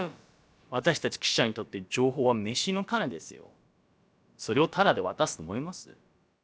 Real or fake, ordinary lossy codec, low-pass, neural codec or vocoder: fake; none; none; codec, 16 kHz, about 1 kbps, DyCAST, with the encoder's durations